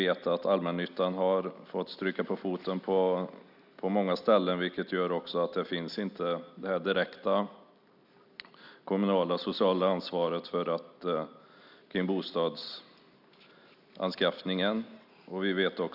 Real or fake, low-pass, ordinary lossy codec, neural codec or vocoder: real; 5.4 kHz; none; none